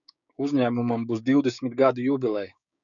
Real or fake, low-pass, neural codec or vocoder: fake; 7.2 kHz; codec, 16 kHz, 6 kbps, DAC